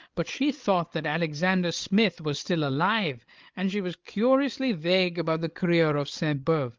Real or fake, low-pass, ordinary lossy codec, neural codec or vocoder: fake; 7.2 kHz; Opus, 32 kbps; codec, 16 kHz, 8 kbps, FreqCodec, larger model